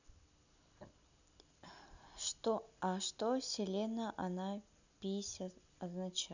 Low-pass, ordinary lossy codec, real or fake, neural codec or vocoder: 7.2 kHz; none; real; none